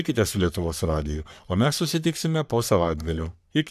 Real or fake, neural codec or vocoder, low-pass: fake; codec, 44.1 kHz, 3.4 kbps, Pupu-Codec; 14.4 kHz